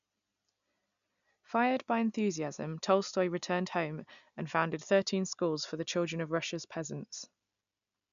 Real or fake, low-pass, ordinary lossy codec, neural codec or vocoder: real; 7.2 kHz; none; none